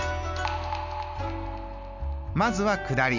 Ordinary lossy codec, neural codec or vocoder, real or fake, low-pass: none; none; real; 7.2 kHz